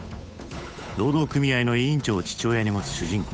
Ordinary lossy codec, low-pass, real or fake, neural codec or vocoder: none; none; fake; codec, 16 kHz, 8 kbps, FunCodec, trained on Chinese and English, 25 frames a second